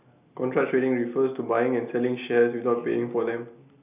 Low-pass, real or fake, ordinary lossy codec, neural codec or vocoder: 3.6 kHz; real; none; none